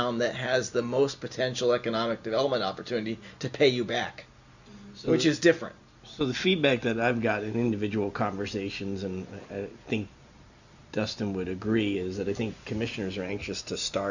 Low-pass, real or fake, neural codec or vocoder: 7.2 kHz; real; none